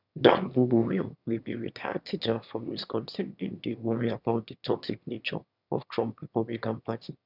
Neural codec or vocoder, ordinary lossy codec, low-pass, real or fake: autoencoder, 22.05 kHz, a latent of 192 numbers a frame, VITS, trained on one speaker; none; 5.4 kHz; fake